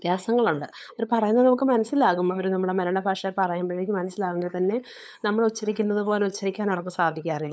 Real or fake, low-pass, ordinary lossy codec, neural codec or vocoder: fake; none; none; codec, 16 kHz, 8 kbps, FunCodec, trained on LibriTTS, 25 frames a second